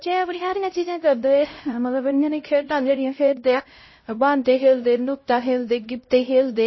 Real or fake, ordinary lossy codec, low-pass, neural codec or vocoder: fake; MP3, 24 kbps; 7.2 kHz; codec, 16 kHz, 0.5 kbps, X-Codec, HuBERT features, trained on LibriSpeech